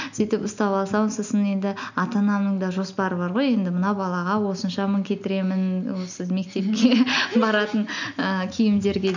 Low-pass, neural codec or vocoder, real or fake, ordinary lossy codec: 7.2 kHz; none; real; none